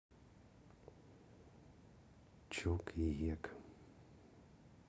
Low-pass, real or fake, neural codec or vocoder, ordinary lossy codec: none; real; none; none